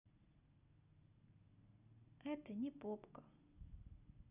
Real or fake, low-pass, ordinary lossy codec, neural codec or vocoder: real; 3.6 kHz; none; none